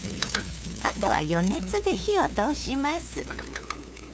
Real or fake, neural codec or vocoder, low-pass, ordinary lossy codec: fake; codec, 16 kHz, 2 kbps, FunCodec, trained on LibriTTS, 25 frames a second; none; none